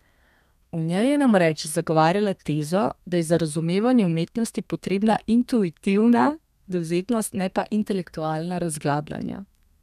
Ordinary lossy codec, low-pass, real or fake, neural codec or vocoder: none; 14.4 kHz; fake; codec, 32 kHz, 1.9 kbps, SNAC